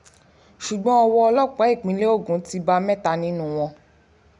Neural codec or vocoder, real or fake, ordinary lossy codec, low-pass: none; real; none; 10.8 kHz